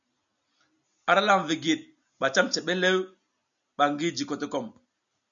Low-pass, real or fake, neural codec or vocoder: 7.2 kHz; real; none